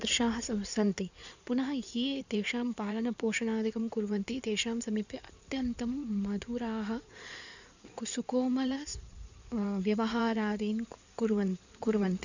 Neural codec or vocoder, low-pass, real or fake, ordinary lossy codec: codec, 16 kHz in and 24 kHz out, 2.2 kbps, FireRedTTS-2 codec; 7.2 kHz; fake; none